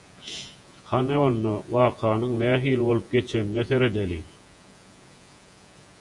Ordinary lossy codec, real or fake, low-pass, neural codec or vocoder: Opus, 64 kbps; fake; 10.8 kHz; vocoder, 48 kHz, 128 mel bands, Vocos